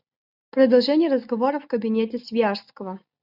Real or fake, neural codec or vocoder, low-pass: real; none; 5.4 kHz